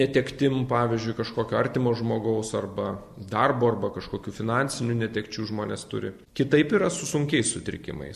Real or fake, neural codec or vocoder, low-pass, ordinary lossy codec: real; none; 14.4 kHz; MP3, 64 kbps